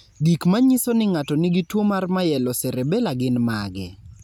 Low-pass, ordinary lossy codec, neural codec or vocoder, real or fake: 19.8 kHz; none; none; real